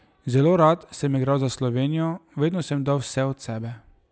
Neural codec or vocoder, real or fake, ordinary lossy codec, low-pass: none; real; none; none